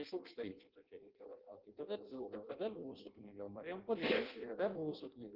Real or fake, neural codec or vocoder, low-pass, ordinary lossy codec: fake; codec, 16 kHz in and 24 kHz out, 0.6 kbps, FireRedTTS-2 codec; 5.4 kHz; Opus, 16 kbps